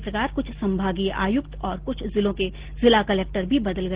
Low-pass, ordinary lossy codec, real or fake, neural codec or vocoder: 3.6 kHz; Opus, 16 kbps; real; none